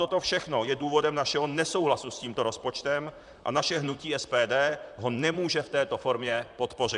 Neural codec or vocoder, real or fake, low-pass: vocoder, 44.1 kHz, 128 mel bands, Pupu-Vocoder; fake; 10.8 kHz